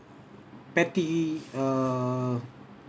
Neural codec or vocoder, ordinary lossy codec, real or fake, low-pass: none; none; real; none